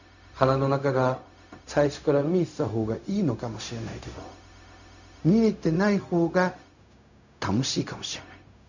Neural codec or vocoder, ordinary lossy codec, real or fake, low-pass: codec, 16 kHz, 0.4 kbps, LongCat-Audio-Codec; none; fake; 7.2 kHz